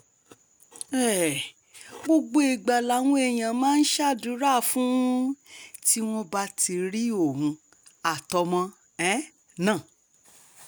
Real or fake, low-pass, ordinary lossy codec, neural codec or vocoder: real; none; none; none